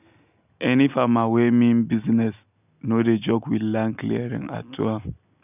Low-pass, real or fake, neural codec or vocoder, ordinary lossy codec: 3.6 kHz; real; none; none